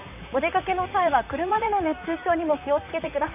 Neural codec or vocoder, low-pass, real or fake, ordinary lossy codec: vocoder, 44.1 kHz, 128 mel bands, Pupu-Vocoder; 3.6 kHz; fake; none